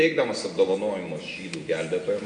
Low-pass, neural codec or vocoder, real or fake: 9.9 kHz; none; real